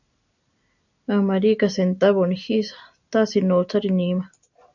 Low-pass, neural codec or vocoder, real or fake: 7.2 kHz; none; real